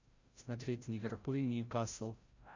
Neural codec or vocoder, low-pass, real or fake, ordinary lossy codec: codec, 16 kHz, 0.5 kbps, FreqCodec, larger model; 7.2 kHz; fake; Opus, 64 kbps